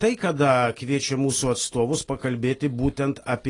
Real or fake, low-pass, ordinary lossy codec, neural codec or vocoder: real; 10.8 kHz; AAC, 32 kbps; none